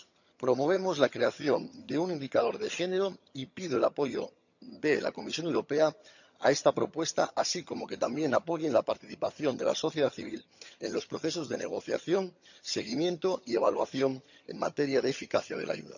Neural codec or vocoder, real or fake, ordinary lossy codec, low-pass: vocoder, 22.05 kHz, 80 mel bands, HiFi-GAN; fake; none; 7.2 kHz